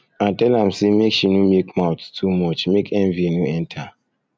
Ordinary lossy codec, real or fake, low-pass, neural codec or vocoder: none; real; none; none